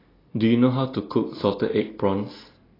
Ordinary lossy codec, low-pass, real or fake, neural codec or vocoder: AAC, 24 kbps; 5.4 kHz; real; none